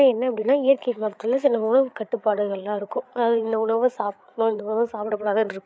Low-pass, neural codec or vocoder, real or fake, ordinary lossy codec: none; codec, 16 kHz, 8 kbps, FreqCodec, larger model; fake; none